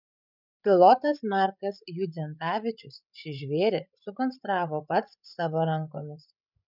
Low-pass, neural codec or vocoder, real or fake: 5.4 kHz; codec, 16 kHz, 8 kbps, FreqCodec, larger model; fake